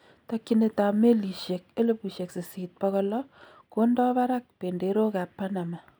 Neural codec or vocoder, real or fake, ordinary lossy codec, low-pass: none; real; none; none